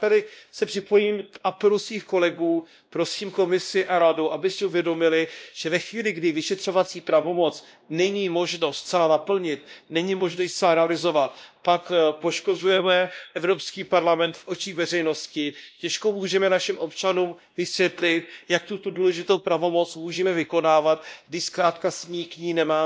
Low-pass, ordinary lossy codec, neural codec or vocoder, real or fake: none; none; codec, 16 kHz, 1 kbps, X-Codec, WavLM features, trained on Multilingual LibriSpeech; fake